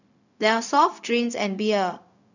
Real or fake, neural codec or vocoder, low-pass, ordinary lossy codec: fake; codec, 16 kHz, 0.4 kbps, LongCat-Audio-Codec; 7.2 kHz; none